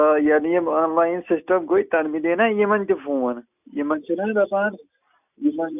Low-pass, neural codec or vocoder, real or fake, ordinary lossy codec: 3.6 kHz; none; real; none